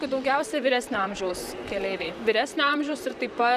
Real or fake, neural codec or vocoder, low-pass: fake; vocoder, 44.1 kHz, 128 mel bands, Pupu-Vocoder; 14.4 kHz